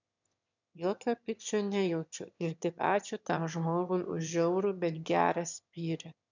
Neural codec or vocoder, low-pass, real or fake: autoencoder, 22.05 kHz, a latent of 192 numbers a frame, VITS, trained on one speaker; 7.2 kHz; fake